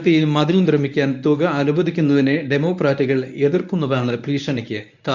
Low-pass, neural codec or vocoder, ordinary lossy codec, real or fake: 7.2 kHz; codec, 24 kHz, 0.9 kbps, WavTokenizer, medium speech release version 1; none; fake